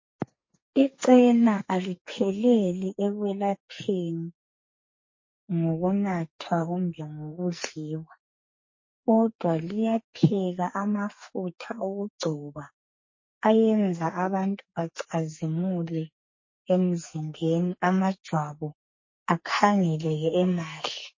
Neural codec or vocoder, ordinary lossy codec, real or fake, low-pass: codec, 44.1 kHz, 2.6 kbps, SNAC; MP3, 32 kbps; fake; 7.2 kHz